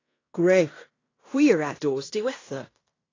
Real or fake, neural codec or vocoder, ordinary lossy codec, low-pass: fake; codec, 16 kHz in and 24 kHz out, 0.9 kbps, LongCat-Audio-Codec, fine tuned four codebook decoder; AAC, 32 kbps; 7.2 kHz